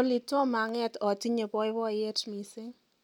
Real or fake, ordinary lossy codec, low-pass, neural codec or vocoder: real; none; 19.8 kHz; none